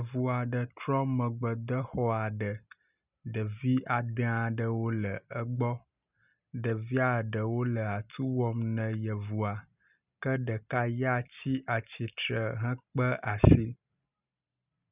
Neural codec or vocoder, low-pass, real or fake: none; 3.6 kHz; real